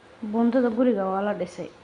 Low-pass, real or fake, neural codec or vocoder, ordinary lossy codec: 9.9 kHz; real; none; Opus, 64 kbps